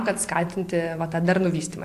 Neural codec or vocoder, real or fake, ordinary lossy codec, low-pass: vocoder, 44.1 kHz, 128 mel bands every 512 samples, BigVGAN v2; fake; AAC, 64 kbps; 14.4 kHz